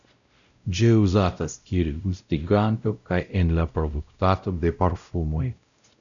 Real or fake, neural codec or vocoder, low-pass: fake; codec, 16 kHz, 0.5 kbps, X-Codec, WavLM features, trained on Multilingual LibriSpeech; 7.2 kHz